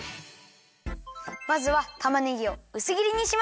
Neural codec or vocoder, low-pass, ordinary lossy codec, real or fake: none; none; none; real